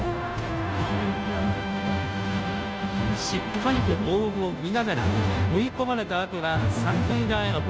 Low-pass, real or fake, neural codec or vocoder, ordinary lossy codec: none; fake; codec, 16 kHz, 0.5 kbps, FunCodec, trained on Chinese and English, 25 frames a second; none